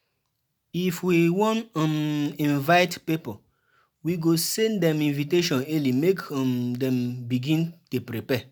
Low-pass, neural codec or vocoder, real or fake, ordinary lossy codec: none; none; real; none